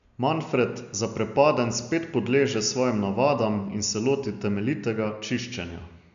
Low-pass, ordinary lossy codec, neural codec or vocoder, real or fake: 7.2 kHz; none; none; real